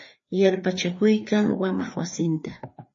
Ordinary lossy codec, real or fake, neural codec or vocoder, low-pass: MP3, 32 kbps; fake; codec, 16 kHz, 2 kbps, FreqCodec, larger model; 7.2 kHz